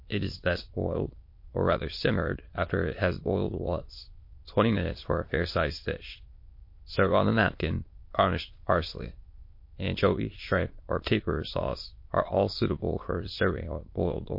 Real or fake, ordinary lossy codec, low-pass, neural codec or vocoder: fake; MP3, 32 kbps; 5.4 kHz; autoencoder, 22.05 kHz, a latent of 192 numbers a frame, VITS, trained on many speakers